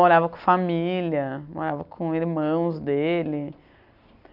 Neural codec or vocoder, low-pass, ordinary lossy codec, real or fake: none; 5.4 kHz; none; real